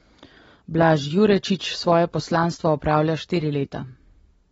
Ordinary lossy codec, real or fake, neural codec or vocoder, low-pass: AAC, 24 kbps; real; none; 19.8 kHz